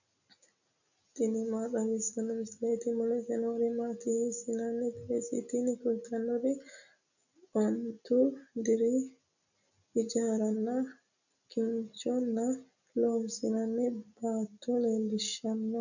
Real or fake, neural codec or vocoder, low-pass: real; none; 7.2 kHz